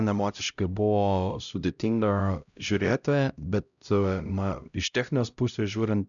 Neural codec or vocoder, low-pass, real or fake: codec, 16 kHz, 0.5 kbps, X-Codec, HuBERT features, trained on LibriSpeech; 7.2 kHz; fake